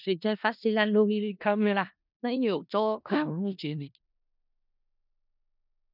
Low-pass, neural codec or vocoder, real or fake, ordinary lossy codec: 5.4 kHz; codec, 16 kHz in and 24 kHz out, 0.4 kbps, LongCat-Audio-Codec, four codebook decoder; fake; none